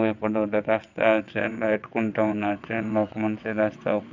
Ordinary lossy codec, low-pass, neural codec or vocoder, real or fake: none; 7.2 kHz; vocoder, 22.05 kHz, 80 mel bands, WaveNeXt; fake